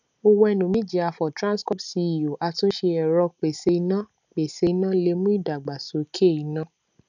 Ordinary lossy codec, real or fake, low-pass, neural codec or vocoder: none; real; 7.2 kHz; none